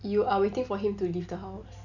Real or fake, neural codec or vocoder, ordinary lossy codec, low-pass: real; none; none; 7.2 kHz